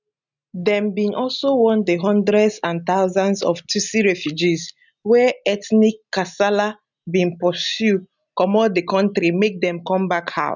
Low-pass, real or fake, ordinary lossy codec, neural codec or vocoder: 7.2 kHz; real; none; none